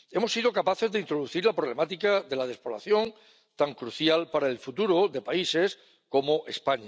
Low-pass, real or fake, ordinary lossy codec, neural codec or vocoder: none; real; none; none